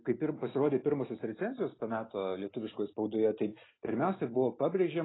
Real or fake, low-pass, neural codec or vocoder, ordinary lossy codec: real; 7.2 kHz; none; AAC, 16 kbps